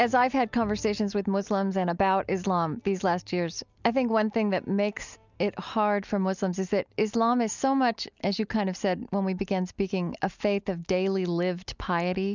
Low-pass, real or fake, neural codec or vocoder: 7.2 kHz; real; none